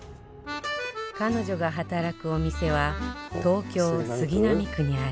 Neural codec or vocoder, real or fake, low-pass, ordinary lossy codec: none; real; none; none